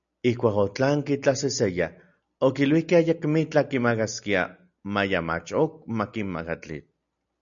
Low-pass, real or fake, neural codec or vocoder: 7.2 kHz; real; none